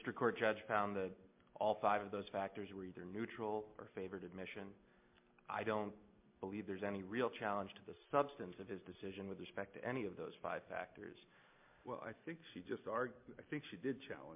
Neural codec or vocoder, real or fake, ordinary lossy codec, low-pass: none; real; MP3, 32 kbps; 3.6 kHz